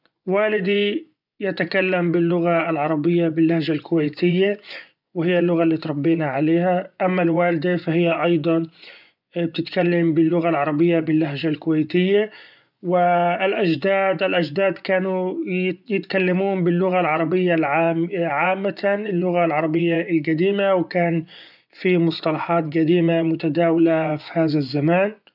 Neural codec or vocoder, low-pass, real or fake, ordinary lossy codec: vocoder, 24 kHz, 100 mel bands, Vocos; 5.4 kHz; fake; none